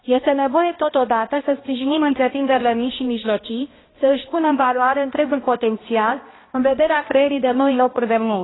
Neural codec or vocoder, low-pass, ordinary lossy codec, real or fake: codec, 16 kHz, 1 kbps, X-Codec, HuBERT features, trained on balanced general audio; 7.2 kHz; AAC, 16 kbps; fake